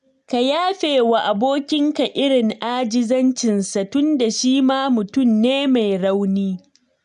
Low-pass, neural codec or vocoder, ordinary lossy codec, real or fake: 10.8 kHz; none; none; real